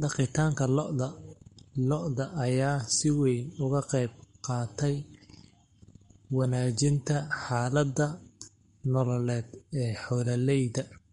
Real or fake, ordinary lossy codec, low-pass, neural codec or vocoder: fake; MP3, 48 kbps; 19.8 kHz; codec, 44.1 kHz, 7.8 kbps, Pupu-Codec